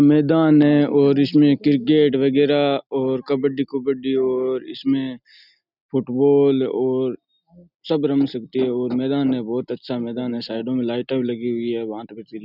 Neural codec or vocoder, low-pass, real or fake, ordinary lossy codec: none; 5.4 kHz; real; none